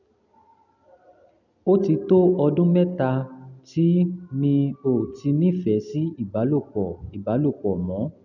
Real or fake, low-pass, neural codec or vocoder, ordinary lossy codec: real; 7.2 kHz; none; none